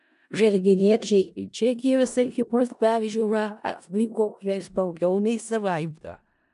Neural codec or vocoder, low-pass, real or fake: codec, 16 kHz in and 24 kHz out, 0.4 kbps, LongCat-Audio-Codec, four codebook decoder; 10.8 kHz; fake